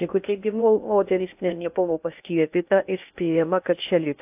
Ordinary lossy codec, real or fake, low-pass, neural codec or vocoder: AAC, 32 kbps; fake; 3.6 kHz; codec, 16 kHz in and 24 kHz out, 0.6 kbps, FocalCodec, streaming, 2048 codes